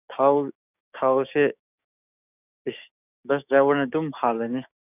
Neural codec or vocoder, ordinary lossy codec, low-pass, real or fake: codec, 16 kHz, 6 kbps, DAC; none; 3.6 kHz; fake